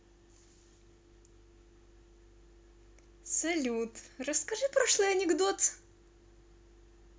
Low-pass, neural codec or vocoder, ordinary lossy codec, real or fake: none; none; none; real